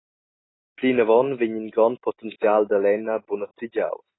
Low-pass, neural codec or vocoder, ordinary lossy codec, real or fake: 7.2 kHz; none; AAC, 16 kbps; real